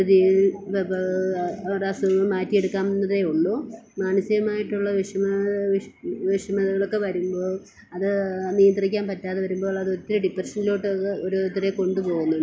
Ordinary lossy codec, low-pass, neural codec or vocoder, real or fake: none; none; none; real